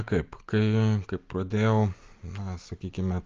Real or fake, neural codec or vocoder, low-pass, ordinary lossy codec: real; none; 7.2 kHz; Opus, 24 kbps